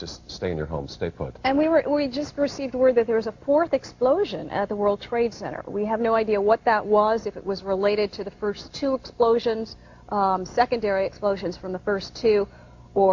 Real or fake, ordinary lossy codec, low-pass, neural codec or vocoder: real; Opus, 64 kbps; 7.2 kHz; none